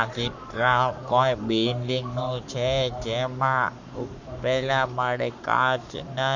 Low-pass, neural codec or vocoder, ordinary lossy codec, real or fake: 7.2 kHz; codec, 44.1 kHz, 7.8 kbps, Pupu-Codec; none; fake